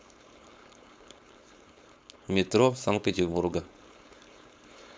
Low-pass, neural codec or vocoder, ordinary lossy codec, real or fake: none; codec, 16 kHz, 4.8 kbps, FACodec; none; fake